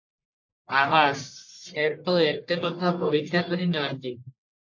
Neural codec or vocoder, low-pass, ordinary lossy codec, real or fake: codec, 44.1 kHz, 1.7 kbps, Pupu-Codec; 7.2 kHz; AAC, 48 kbps; fake